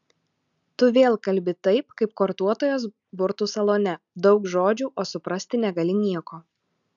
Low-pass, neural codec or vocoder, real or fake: 7.2 kHz; none; real